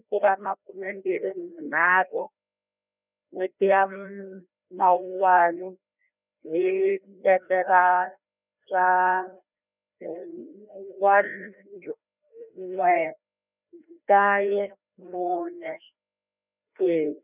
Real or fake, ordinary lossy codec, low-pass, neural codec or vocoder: fake; none; 3.6 kHz; codec, 16 kHz, 1 kbps, FreqCodec, larger model